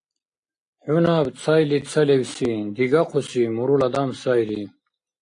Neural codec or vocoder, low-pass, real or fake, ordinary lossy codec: none; 9.9 kHz; real; AAC, 48 kbps